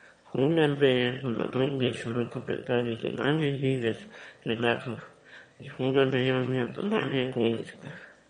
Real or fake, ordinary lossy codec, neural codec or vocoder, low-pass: fake; MP3, 48 kbps; autoencoder, 22.05 kHz, a latent of 192 numbers a frame, VITS, trained on one speaker; 9.9 kHz